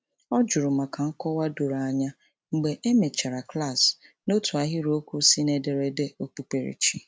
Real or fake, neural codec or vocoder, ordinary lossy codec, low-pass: real; none; none; none